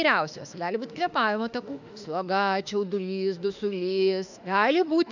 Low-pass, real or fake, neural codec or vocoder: 7.2 kHz; fake; autoencoder, 48 kHz, 32 numbers a frame, DAC-VAE, trained on Japanese speech